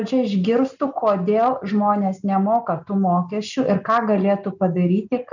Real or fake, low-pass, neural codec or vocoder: real; 7.2 kHz; none